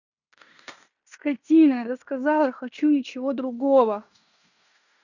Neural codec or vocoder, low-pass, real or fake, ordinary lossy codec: codec, 16 kHz in and 24 kHz out, 0.9 kbps, LongCat-Audio-Codec, fine tuned four codebook decoder; 7.2 kHz; fake; none